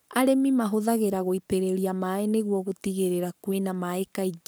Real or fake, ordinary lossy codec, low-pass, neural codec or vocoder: fake; none; none; codec, 44.1 kHz, 7.8 kbps, Pupu-Codec